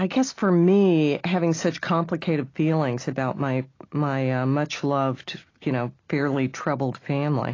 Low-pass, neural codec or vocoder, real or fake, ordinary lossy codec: 7.2 kHz; none; real; AAC, 32 kbps